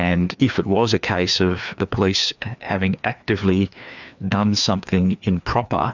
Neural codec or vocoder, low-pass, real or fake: codec, 16 kHz, 2 kbps, FreqCodec, larger model; 7.2 kHz; fake